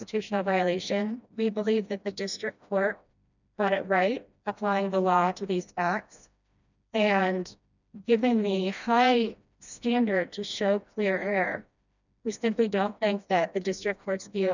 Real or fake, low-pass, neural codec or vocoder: fake; 7.2 kHz; codec, 16 kHz, 1 kbps, FreqCodec, smaller model